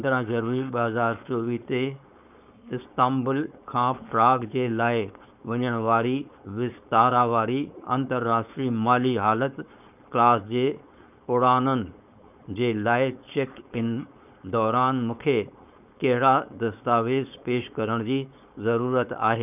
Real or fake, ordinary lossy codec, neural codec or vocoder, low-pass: fake; none; codec, 16 kHz, 4.8 kbps, FACodec; 3.6 kHz